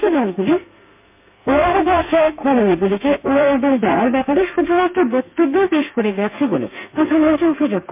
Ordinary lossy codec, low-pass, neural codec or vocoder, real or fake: MP3, 32 kbps; 3.6 kHz; codec, 32 kHz, 1.9 kbps, SNAC; fake